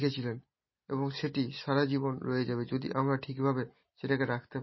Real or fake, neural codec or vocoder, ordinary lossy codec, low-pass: real; none; MP3, 24 kbps; 7.2 kHz